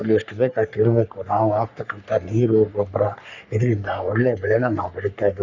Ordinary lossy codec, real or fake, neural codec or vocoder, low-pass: none; fake; codec, 44.1 kHz, 3.4 kbps, Pupu-Codec; 7.2 kHz